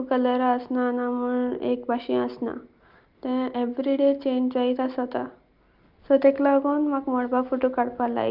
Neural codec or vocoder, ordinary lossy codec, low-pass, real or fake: none; Opus, 24 kbps; 5.4 kHz; real